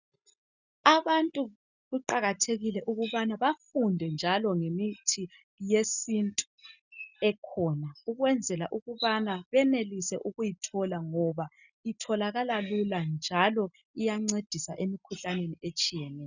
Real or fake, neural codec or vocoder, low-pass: real; none; 7.2 kHz